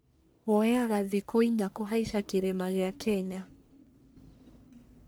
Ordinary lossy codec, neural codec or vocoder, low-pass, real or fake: none; codec, 44.1 kHz, 1.7 kbps, Pupu-Codec; none; fake